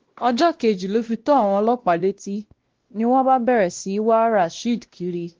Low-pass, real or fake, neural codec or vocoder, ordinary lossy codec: 7.2 kHz; fake; codec, 16 kHz, 1 kbps, X-Codec, WavLM features, trained on Multilingual LibriSpeech; Opus, 16 kbps